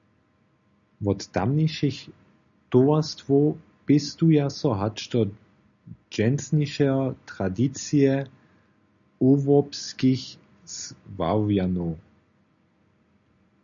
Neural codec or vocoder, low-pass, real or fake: none; 7.2 kHz; real